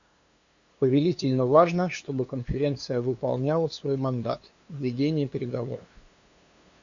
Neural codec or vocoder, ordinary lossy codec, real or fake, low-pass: codec, 16 kHz, 2 kbps, FunCodec, trained on LibriTTS, 25 frames a second; Opus, 64 kbps; fake; 7.2 kHz